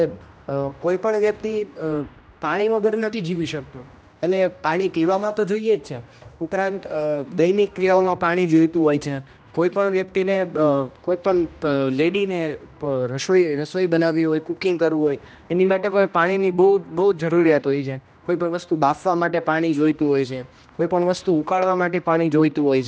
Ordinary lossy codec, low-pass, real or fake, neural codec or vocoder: none; none; fake; codec, 16 kHz, 1 kbps, X-Codec, HuBERT features, trained on general audio